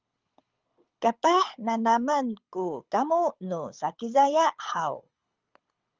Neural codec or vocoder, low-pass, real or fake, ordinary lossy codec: codec, 24 kHz, 6 kbps, HILCodec; 7.2 kHz; fake; Opus, 32 kbps